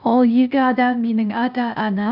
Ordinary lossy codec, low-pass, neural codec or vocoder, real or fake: none; 5.4 kHz; codec, 16 kHz, 0.8 kbps, ZipCodec; fake